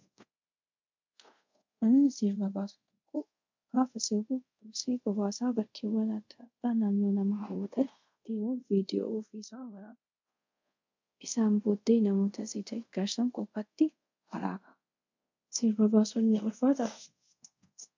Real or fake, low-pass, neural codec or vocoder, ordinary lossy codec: fake; 7.2 kHz; codec, 24 kHz, 0.5 kbps, DualCodec; MP3, 64 kbps